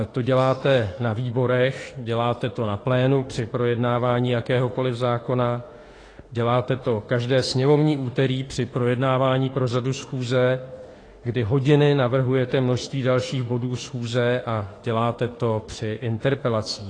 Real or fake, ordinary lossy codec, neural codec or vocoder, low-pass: fake; AAC, 32 kbps; autoencoder, 48 kHz, 32 numbers a frame, DAC-VAE, trained on Japanese speech; 9.9 kHz